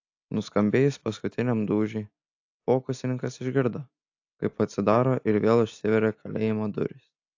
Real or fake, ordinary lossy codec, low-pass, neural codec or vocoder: real; AAC, 48 kbps; 7.2 kHz; none